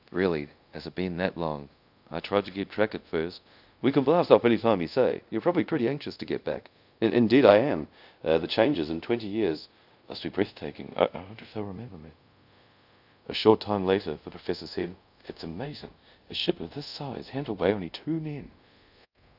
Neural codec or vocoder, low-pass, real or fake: codec, 24 kHz, 0.5 kbps, DualCodec; 5.4 kHz; fake